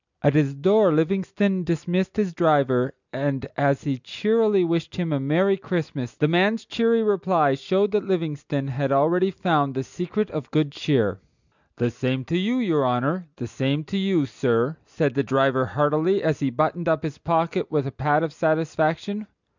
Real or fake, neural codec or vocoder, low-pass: real; none; 7.2 kHz